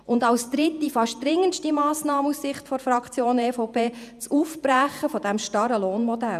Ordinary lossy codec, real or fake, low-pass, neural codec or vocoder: none; fake; 14.4 kHz; vocoder, 48 kHz, 128 mel bands, Vocos